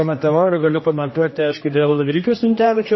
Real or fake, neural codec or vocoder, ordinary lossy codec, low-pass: fake; codec, 16 kHz, 1 kbps, X-Codec, HuBERT features, trained on general audio; MP3, 24 kbps; 7.2 kHz